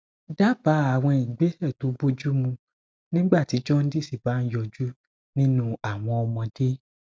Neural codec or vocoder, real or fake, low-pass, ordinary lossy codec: none; real; none; none